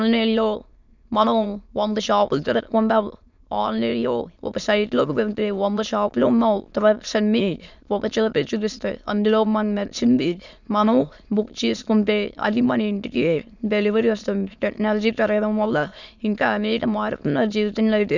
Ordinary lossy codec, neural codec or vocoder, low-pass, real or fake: none; autoencoder, 22.05 kHz, a latent of 192 numbers a frame, VITS, trained on many speakers; 7.2 kHz; fake